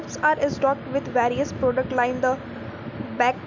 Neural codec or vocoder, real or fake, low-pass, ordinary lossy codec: none; real; 7.2 kHz; none